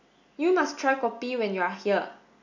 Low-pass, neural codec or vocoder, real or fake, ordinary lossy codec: 7.2 kHz; none; real; none